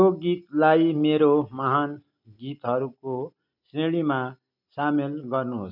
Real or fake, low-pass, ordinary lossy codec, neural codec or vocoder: real; 5.4 kHz; none; none